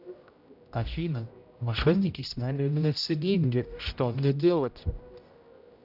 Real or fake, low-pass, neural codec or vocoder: fake; 5.4 kHz; codec, 16 kHz, 0.5 kbps, X-Codec, HuBERT features, trained on general audio